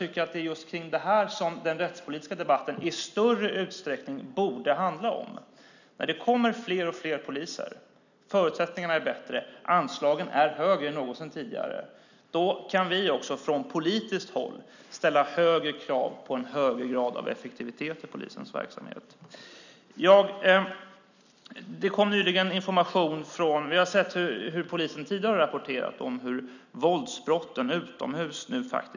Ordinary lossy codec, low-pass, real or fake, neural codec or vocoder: none; 7.2 kHz; real; none